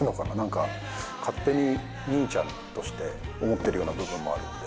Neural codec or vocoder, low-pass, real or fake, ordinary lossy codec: none; none; real; none